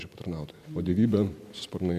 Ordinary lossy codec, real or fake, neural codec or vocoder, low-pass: AAC, 96 kbps; real; none; 14.4 kHz